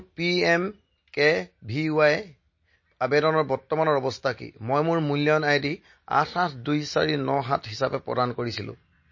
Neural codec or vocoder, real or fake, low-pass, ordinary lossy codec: none; real; 7.2 kHz; MP3, 32 kbps